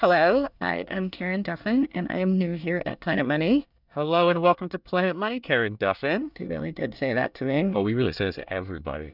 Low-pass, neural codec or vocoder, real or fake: 5.4 kHz; codec, 24 kHz, 1 kbps, SNAC; fake